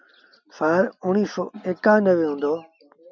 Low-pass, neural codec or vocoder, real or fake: 7.2 kHz; none; real